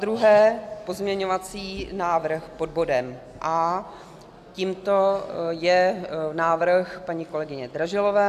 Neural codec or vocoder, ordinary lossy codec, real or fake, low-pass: none; AAC, 96 kbps; real; 14.4 kHz